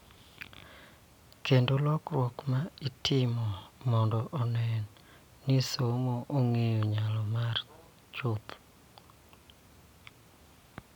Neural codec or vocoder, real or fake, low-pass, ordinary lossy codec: none; real; 19.8 kHz; none